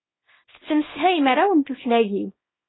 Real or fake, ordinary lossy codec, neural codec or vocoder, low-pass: fake; AAC, 16 kbps; codec, 24 kHz, 0.9 kbps, WavTokenizer, small release; 7.2 kHz